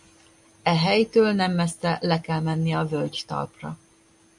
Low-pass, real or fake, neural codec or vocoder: 10.8 kHz; real; none